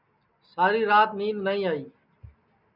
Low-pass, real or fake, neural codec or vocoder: 5.4 kHz; real; none